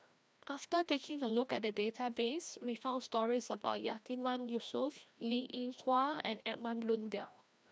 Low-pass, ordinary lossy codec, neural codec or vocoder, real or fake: none; none; codec, 16 kHz, 1 kbps, FreqCodec, larger model; fake